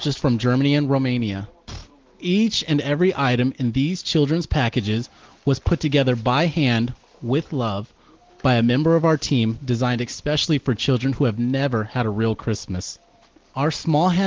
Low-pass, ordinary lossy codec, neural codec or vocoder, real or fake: 7.2 kHz; Opus, 16 kbps; none; real